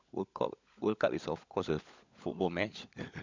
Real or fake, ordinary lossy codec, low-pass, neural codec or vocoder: fake; MP3, 64 kbps; 7.2 kHz; codec, 16 kHz, 16 kbps, FunCodec, trained on LibriTTS, 50 frames a second